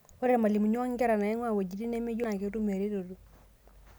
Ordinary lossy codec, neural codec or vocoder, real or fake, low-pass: none; none; real; none